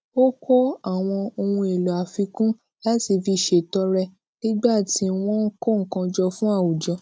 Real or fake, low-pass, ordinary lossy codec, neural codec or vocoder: real; none; none; none